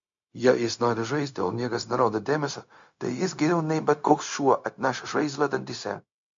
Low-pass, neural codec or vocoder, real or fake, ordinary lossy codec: 7.2 kHz; codec, 16 kHz, 0.4 kbps, LongCat-Audio-Codec; fake; MP3, 48 kbps